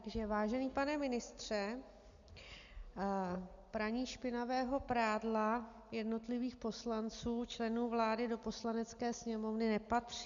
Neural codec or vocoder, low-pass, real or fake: none; 7.2 kHz; real